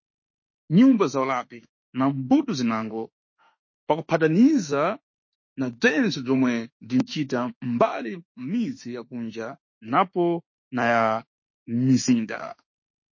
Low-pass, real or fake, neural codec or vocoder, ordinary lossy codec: 7.2 kHz; fake; autoencoder, 48 kHz, 32 numbers a frame, DAC-VAE, trained on Japanese speech; MP3, 32 kbps